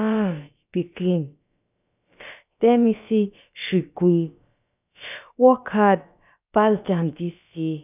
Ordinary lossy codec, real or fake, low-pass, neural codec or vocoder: none; fake; 3.6 kHz; codec, 16 kHz, about 1 kbps, DyCAST, with the encoder's durations